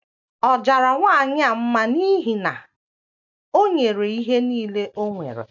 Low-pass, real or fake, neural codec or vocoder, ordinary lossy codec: 7.2 kHz; real; none; none